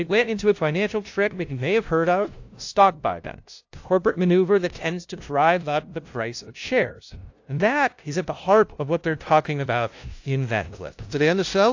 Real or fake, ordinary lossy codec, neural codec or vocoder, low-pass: fake; AAC, 48 kbps; codec, 16 kHz, 0.5 kbps, FunCodec, trained on LibriTTS, 25 frames a second; 7.2 kHz